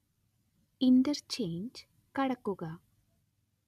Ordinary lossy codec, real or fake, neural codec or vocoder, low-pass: none; real; none; 14.4 kHz